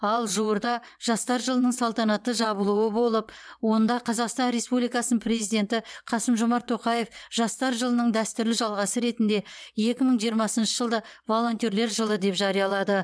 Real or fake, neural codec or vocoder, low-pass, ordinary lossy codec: fake; vocoder, 22.05 kHz, 80 mel bands, WaveNeXt; none; none